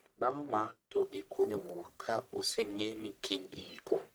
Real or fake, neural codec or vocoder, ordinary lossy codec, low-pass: fake; codec, 44.1 kHz, 1.7 kbps, Pupu-Codec; none; none